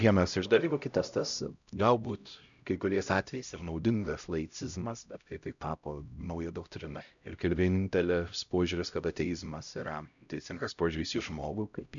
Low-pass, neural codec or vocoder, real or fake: 7.2 kHz; codec, 16 kHz, 0.5 kbps, X-Codec, HuBERT features, trained on LibriSpeech; fake